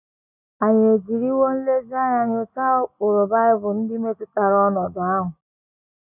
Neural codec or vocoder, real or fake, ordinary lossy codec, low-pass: none; real; none; 3.6 kHz